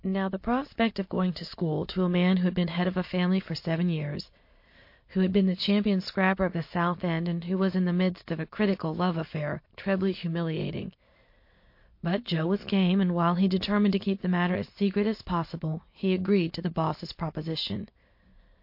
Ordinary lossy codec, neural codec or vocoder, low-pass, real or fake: MP3, 32 kbps; none; 5.4 kHz; real